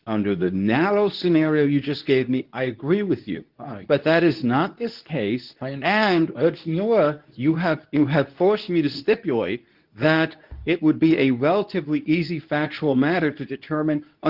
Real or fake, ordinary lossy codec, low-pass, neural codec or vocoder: fake; Opus, 32 kbps; 5.4 kHz; codec, 24 kHz, 0.9 kbps, WavTokenizer, medium speech release version 2